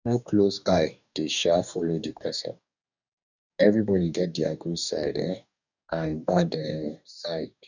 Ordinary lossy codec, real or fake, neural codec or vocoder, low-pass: none; fake; codec, 44.1 kHz, 2.6 kbps, DAC; 7.2 kHz